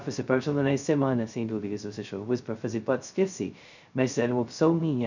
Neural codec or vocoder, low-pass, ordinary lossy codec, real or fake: codec, 16 kHz, 0.2 kbps, FocalCodec; 7.2 kHz; none; fake